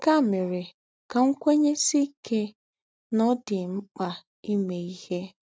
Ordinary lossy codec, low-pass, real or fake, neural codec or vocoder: none; none; real; none